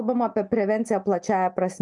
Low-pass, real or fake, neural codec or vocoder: 10.8 kHz; real; none